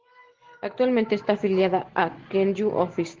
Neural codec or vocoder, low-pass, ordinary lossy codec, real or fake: none; 7.2 kHz; Opus, 16 kbps; real